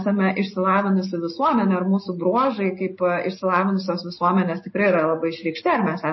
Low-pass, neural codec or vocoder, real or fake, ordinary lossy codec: 7.2 kHz; none; real; MP3, 24 kbps